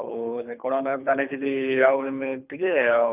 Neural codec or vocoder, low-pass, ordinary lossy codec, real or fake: codec, 24 kHz, 3 kbps, HILCodec; 3.6 kHz; none; fake